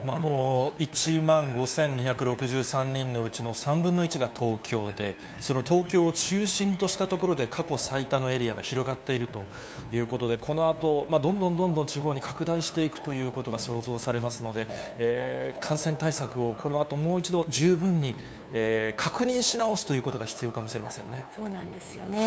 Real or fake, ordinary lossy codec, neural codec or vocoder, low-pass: fake; none; codec, 16 kHz, 2 kbps, FunCodec, trained on LibriTTS, 25 frames a second; none